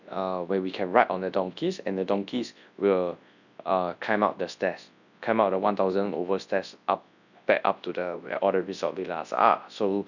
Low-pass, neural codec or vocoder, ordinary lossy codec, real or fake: 7.2 kHz; codec, 24 kHz, 0.9 kbps, WavTokenizer, large speech release; none; fake